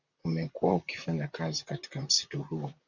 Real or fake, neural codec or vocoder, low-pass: fake; vocoder, 44.1 kHz, 128 mel bands, Pupu-Vocoder; 7.2 kHz